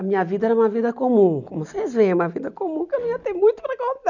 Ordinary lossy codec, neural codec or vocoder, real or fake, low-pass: none; none; real; 7.2 kHz